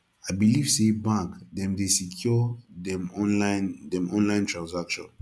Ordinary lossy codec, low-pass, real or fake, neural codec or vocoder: none; none; real; none